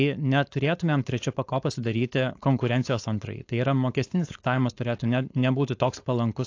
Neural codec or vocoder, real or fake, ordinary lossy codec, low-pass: codec, 16 kHz, 4.8 kbps, FACodec; fake; AAC, 48 kbps; 7.2 kHz